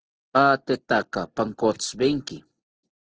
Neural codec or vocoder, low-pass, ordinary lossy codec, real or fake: none; 7.2 kHz; Opus, 16 kbps; real